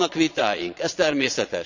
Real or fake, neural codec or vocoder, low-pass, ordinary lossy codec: fake; vocoder, 22.05 kHz, 80 mel bands, Vocos; 7.2 kHz; none